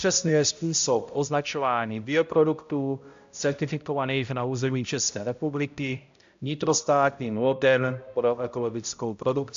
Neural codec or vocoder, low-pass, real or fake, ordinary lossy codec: codec, 16 kHz, 0.5 kbps, X-Codec, HuBERT features, trained on balanced general audio; 7.2 kHz; fake; AAC, 64 kbps